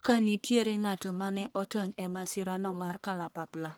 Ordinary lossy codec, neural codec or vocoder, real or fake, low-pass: none; codec, 44.1 kHz, 1.7 kbps, Pupu-Codec; fake; none